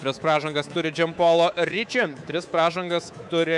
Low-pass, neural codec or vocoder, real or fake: 10.8 kHz; codec, 24 kHz, 3.1 kbps, DualCodec; fake